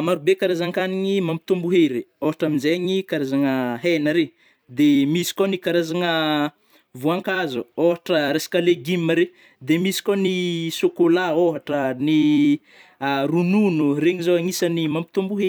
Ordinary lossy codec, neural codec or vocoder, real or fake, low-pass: none; vocoder, 44.1 kHz, 128 mel bands every 256 samples, BigVGAN v2; fake; none